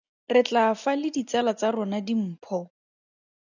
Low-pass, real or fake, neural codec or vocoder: 7.2 kHz; real; none